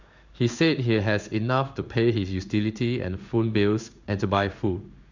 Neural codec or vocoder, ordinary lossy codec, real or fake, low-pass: codec, 16 kHz in and 24 kHz out, 1 kbps, XY-Tokenizer; none; fake; 7.2 kHz